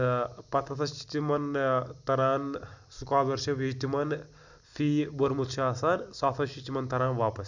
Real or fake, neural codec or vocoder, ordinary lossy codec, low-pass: real; none; none; 7.2 kHz